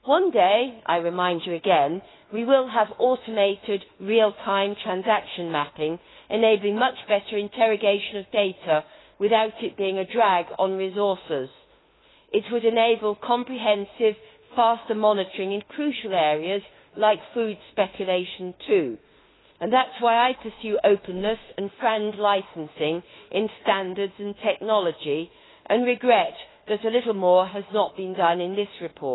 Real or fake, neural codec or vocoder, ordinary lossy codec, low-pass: fake; autoencoder, 48 kHz, 32 numbers a frame, DAC-VAE, trained on Japanese speech; AAC, 16 kbps; 7.2 kHz